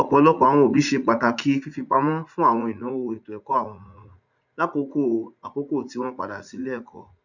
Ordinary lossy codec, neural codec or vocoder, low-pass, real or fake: none; vocoder, 44.1 kHz, 80 mel bands, Vocos; 7.2 kHz; fake